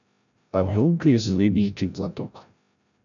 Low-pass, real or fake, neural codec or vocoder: 7.2 kHz; fake; codec, 16 kHz, 0.5 kbps, FreqCodec, larger model